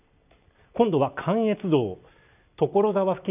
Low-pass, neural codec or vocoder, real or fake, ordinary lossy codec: 3.6 kHz; none; real; none